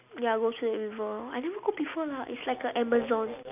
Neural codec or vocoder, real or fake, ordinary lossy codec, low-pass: none; real; none; 3.6 kHz